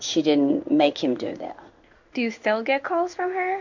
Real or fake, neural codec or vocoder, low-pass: fake; codec, 16 kHz in and 24 kHz out, 1 kbps, XY-Tokenizer; 7.2 kHz